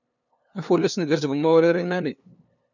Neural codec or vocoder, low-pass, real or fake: codec, 16 kHz, 2 kbps, FunCodec, trained on LibriTTS, 25 frames a second; 7.2 kHz; fake